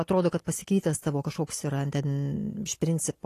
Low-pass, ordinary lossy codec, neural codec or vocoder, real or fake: 14.4 kHz; AAC, 48 kbps; none; real